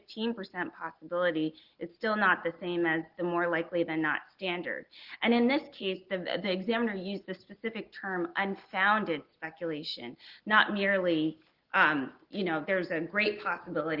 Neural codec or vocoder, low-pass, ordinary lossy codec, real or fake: none; 5.4 kHz; Opus, 16 kbps; real